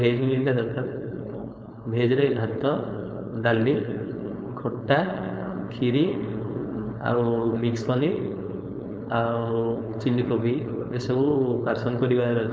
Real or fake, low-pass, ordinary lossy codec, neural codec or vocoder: fake; none; none; codec, 16 kHz, 4.8 kbps, FACodec